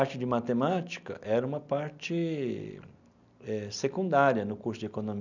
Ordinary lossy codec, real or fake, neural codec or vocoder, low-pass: none; real; none; 7.2 kHz